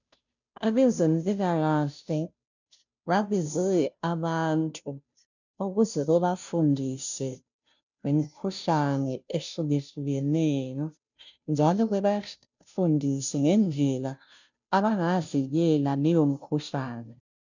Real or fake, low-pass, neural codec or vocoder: fake; 7.2 kHz; codec, 16 kHz, 0.5 kbps, FunCodec, trained on Chinese and English, 25 frames a second